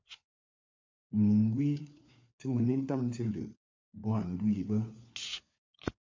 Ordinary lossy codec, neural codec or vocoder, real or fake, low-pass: MP3, 48 kbps; codec, 16 kHz, 4 kbps, FunCodec, trained on LibriTTS, 50 frames a second; fake; 7.2 kHz